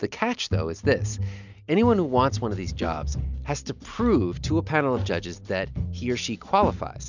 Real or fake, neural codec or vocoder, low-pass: real; none; 7.2 kHz